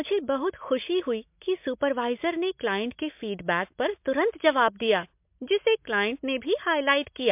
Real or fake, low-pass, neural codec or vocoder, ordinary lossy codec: real; 3.6 kHz; none; MP3, 32 kbps